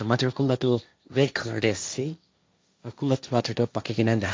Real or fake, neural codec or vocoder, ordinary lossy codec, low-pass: fake; codec, 16 kHz, 1.1 kbps, Voila-Tokenizer; none; none